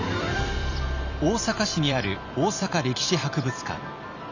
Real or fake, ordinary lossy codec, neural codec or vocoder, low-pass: fake; none; vocoder, 44.1 kHz, 128 mel bands every 256 samples, BigVGAN v2; 7.2 kHz